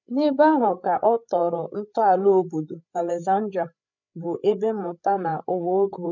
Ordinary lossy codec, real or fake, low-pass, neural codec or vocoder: none; fake; 7.2 kHz; codec, 16 kHz, 8 kbps, FreqCodec, larger model